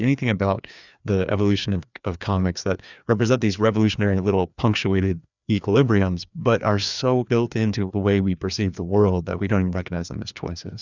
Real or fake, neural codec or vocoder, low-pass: fake; codec, 16 kHz, 2 kbps, FreqCodec, larger model; 7.2 kHz